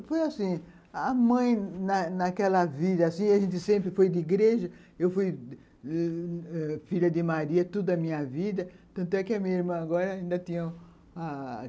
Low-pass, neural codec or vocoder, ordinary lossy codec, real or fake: none; none; none; real